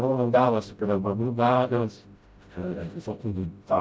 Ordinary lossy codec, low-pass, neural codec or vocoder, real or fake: none; none; codec, 16 kHz, 0.5 kbps, FreqCodec, smaller model; fake